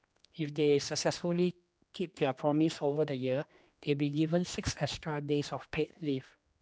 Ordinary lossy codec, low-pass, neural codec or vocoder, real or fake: none; none; codec, 16 kHz, 2 kbps, X-Codec, HuBERT features, trained on general audio; fake